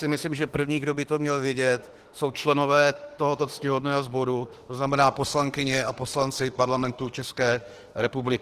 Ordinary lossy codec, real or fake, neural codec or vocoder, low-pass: Opus, 16 kbps; fake; autoencoder, 48 kHz, 32 numbers a frame, DAC-VAE, trained on Japanese speech; 14.4 kHz